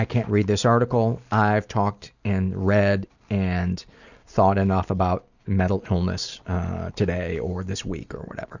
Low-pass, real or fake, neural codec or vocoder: 7.2 kHz; real; none